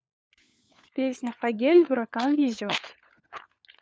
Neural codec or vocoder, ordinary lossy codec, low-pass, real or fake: codec, 16 kHz, 4 kbps, FunCodec, trained on LibriTTS, 50 frames a second; none; none; fake